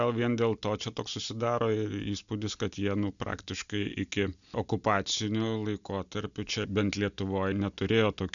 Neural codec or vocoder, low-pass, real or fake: none; 7.2 kHz; real